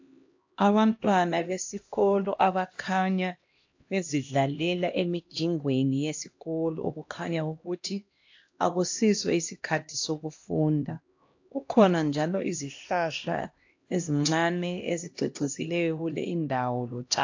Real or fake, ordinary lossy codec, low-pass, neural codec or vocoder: fake; AAC, 48 kbps; 7.2 kHz; codec, 16 kHz, 1 kbps, X-Codec, HuBERT features, trained on LibriSpeech